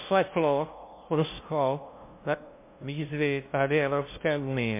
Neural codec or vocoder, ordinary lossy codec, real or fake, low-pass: codec, 16 kHz, 0.5 kbps, FunCodec, trained on LibriTTS, 25 frames a second; MP3, 24 kbps; fake; 3.6 kHz